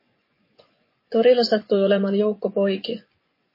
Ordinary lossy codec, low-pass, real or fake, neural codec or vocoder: MP3, 24 kbps; 5.4 kHz; fake; vocoder, 24 kHz, 100 mel bands, Vocos